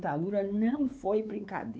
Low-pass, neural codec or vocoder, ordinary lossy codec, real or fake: none; codec, 16 kHz, 4 kbps, X-Codec, WavLM features, trained on Multilingual LibriSpeech; none; fake